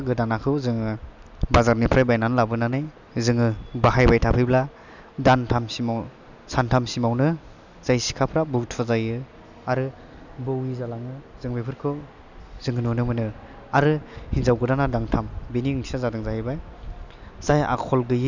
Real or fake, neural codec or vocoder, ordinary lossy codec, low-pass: real; none; none; 7.2 kHz